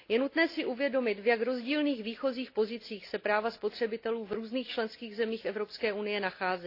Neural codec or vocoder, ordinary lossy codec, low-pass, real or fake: none; AAC, 32 kbps; 5.4 kHz; real